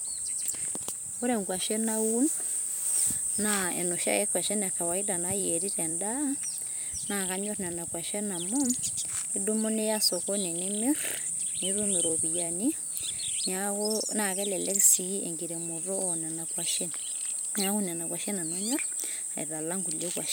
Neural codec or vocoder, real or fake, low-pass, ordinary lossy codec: vocoder, 44.1 kHz, 128 mel bands every 256 samples, BigVGAN v2; fake; none; none